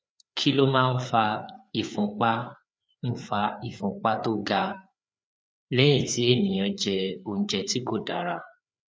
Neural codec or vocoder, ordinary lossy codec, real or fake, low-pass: codec, 16 kHz, 4 kbps, FreqCodec, larger model; none; fake; none